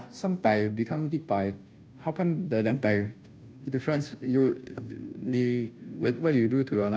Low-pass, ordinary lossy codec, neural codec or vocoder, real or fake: none; none; codec, 16 kHz, 0.5 kbps, FunCodec, trained on Chinese and English, 25 frames a second; fake